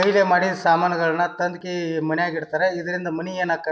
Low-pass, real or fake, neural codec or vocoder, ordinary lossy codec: none; real; none; none